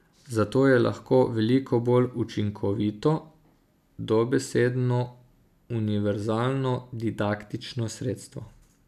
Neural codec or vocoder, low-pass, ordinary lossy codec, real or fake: none; 14.4 kHz; none; real